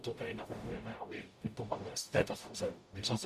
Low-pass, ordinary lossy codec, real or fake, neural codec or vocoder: 14.4 kHz; Opus, 64 kbps; fake; codec, 44.1 kHz, 0.9 kbps, DAC